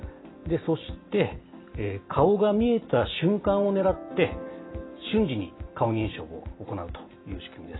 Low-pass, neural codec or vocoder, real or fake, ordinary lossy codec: 7.2 kHz; none; real; AAC, 16 kbps